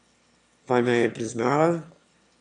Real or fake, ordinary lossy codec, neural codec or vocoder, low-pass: fake; MP3, 96 kbps; autoencoder, 22.05 kHz, a latent of 192 numbers a frame, VITS, trained on one speaker; 9.9 kHz